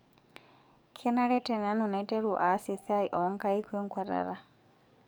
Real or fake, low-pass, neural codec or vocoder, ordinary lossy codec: fake; none; codec, 44.1 kHz, 7.8 kbps, DAC; none